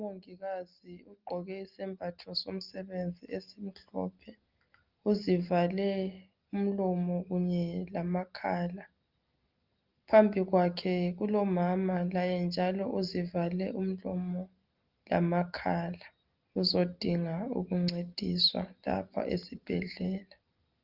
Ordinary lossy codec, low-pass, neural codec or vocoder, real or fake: Opus, 24 kbps; 5.4 kHz; none; real